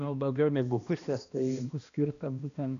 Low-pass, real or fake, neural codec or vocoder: 7.2 kHz; fake; codec, 16 kHz, 1 kbps, X-Codec, HuBERT features, trained on balanced general audio